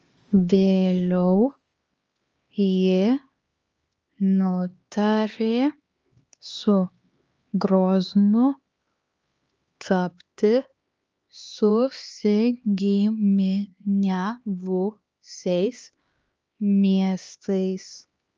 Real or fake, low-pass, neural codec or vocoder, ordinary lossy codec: fake; 7.2 kHz; codec, 16 kHz, 2 kbps, X-Codec, HuBERT features, trained on LibriSpeech; Opus, 24 kbps